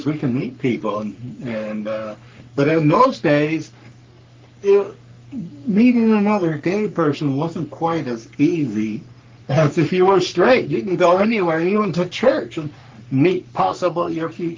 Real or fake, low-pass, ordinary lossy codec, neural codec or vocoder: fake; 7.2 kHz; Opus, 32 kbps; codec, 44.1 kHz, 3.4 kbps, Pupu-Codec